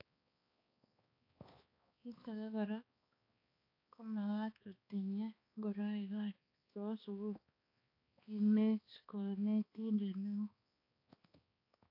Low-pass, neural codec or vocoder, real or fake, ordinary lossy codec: 5.4 kHz; codec, 16 kHz, 2 kbps, X-Codec, HuBERT features, trained on balanced general audio; fake; AAC, 32 kbps